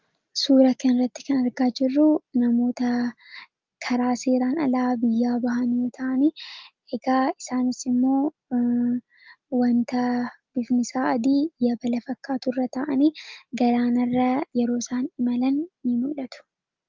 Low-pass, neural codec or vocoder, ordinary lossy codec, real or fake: 7.2 kHz; none; Opus, 32 kbps; real